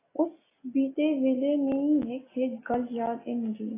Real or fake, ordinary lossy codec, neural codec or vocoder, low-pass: real; AAC, 16 kbps; none; 3.6 kHz